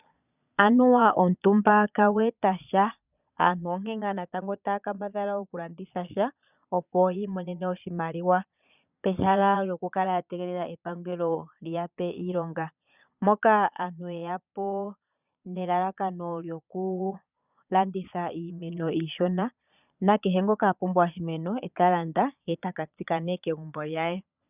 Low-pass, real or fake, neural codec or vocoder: 3.6 kHz; fake; vocoder, 22.05 kHz, 80 mel bands, Vocos